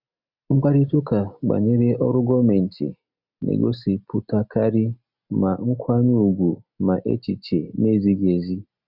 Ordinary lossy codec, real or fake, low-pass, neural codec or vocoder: none; real; 5.4 kHz; none